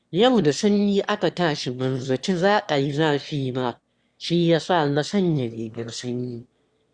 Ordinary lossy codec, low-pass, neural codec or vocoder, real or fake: Opus, 64 kbps; 9.9 kHz; autoencoder, 22.05 kHz, a latent of 192 numbers a frame, VITS, trained on one speaker; fake